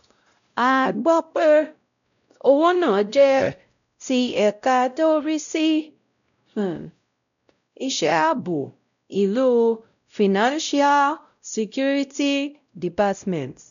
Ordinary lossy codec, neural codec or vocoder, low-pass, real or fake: none; codec, 16 kHz, 0.5 kbps, X-Codec, WavLM features, trained on Multilingual LibriSpeech; 7.2 kHz; fake